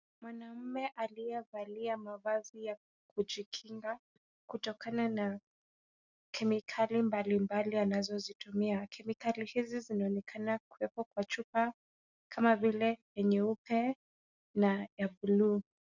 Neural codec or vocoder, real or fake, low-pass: none; real; 7.2 kHz